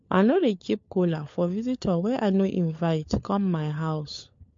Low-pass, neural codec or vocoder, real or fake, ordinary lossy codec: 7.2 kHz; codec, 16 kHz, 4 kbps, FunCodec, trained on LibriTTS, 50 frames a second; fake; MP3, 48 kbps